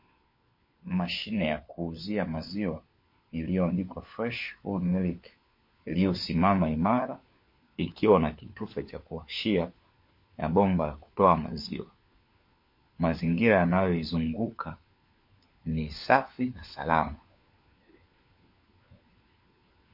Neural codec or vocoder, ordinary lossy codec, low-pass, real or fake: codec, 16 kHz, 4 kbps, FunCodec, trained on LibriTTS, 50 frames a second; MP3, 32 kbps; 5.4 kHz; fake